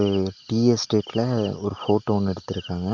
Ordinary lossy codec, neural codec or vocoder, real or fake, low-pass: Opus, 32 kbps; none; real; 7.2 kHz